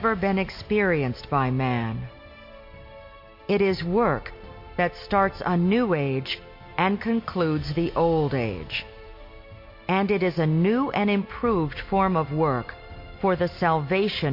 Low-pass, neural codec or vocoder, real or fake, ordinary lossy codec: 5.4 kHz; none; real; MP3, 32 kbps